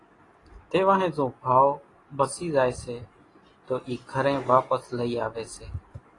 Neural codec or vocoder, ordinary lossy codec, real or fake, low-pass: none; AAC, 32 kbps; real; 10.8 kHz